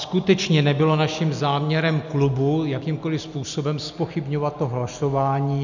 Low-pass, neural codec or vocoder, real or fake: 7.2 kHz; none; real